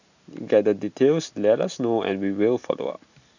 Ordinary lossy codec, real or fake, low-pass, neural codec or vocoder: none; real; 7.2 kHz; none